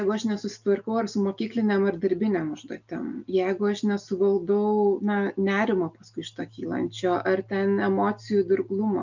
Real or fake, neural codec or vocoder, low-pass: real; none; 7.2 kHz